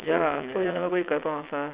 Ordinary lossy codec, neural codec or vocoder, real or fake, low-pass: Opus, 64 kbps; vocoder, 22.05 kHz, 80 mel bands, WaveNeXt; fake; 3.6 kHz